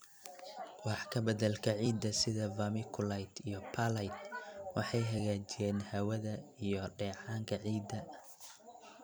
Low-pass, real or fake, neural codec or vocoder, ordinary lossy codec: none; fake; vocoder, 44.1 kHz, 128 mel bands every 256 samples, BigVGAN v2; none